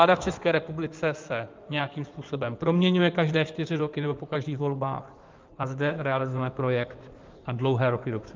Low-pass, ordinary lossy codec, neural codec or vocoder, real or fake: 7.2 kHz; Opus, 24 kbps; codec, 16 kHz in and 24 kHz out, 2.2 kbps, FireRedTTS-2 codec; fake